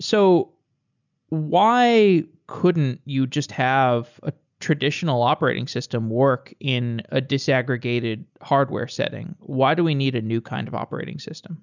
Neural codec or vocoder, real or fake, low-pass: none; real; 7.2 kHz